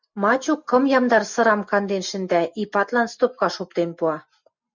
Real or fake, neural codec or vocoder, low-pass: real; none; 7.2 kHz